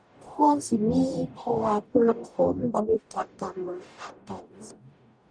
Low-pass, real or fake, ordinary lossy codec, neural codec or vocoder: 9.9 kHz; fake; none; codec, 44.1 kHz, 0.9 kbps, DAC